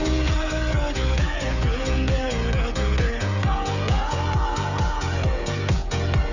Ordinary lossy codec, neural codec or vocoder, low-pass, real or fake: none; codec, 16 kHz, 16 kbps, FreqCodec, smaller model; 7.2 kHz; fake